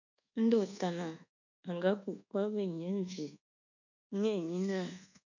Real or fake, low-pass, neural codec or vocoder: fake; 7.2 kHz; codec, 24 kHz, 1.2 kbps, DualCodec